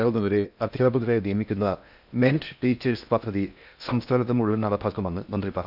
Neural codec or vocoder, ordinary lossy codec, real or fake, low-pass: codec, 16 kHz in and 24 kHz out, 0.6 kbps, FocalCodec, streaming, 2048 codes; none; fake; 5.4 kHz